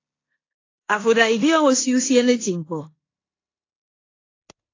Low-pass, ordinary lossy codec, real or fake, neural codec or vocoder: 7.2 kHz; AAC, 32 kbps; fake; codec, 16 kHz in and 24 kHz out, 0.9 kbps, LongCat-Audio-Codec, four codebook decoder